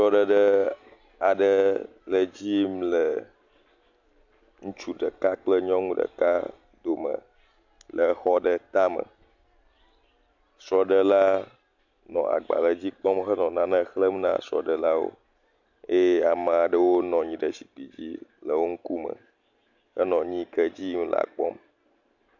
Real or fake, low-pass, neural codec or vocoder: real; 7.2 kHz; none